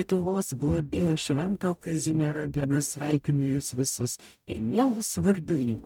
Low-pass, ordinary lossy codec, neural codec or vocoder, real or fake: 19.8 kHz; MP3, 96 kbps; codec, 44.1 kHz, 0.9 kbps, DAC; fake